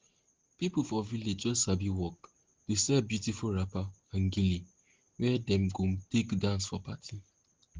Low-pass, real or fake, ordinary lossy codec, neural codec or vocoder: 7.2 kHz; real; Opus, 16 kbps; none